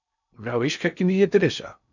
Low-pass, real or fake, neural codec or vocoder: 7.2 kHz; fake; codec, 16 kHz in and 24 kHz out, 0.6 kbps, FocalCodec, streaming, 2048 codes